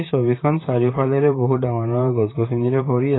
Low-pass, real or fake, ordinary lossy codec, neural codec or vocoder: 7.2 kHz; fake; AAC, 16 kbps; vocoder, 44.1 kHz, 128 mel bands, Pupu-Vocoder